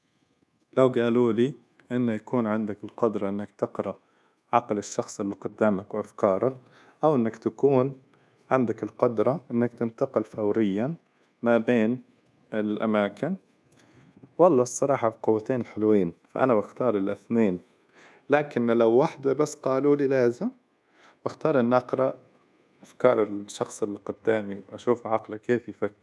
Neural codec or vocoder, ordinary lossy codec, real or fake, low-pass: codec, 24 kHz, 1.2 kbps, DualCodec; none; fake; none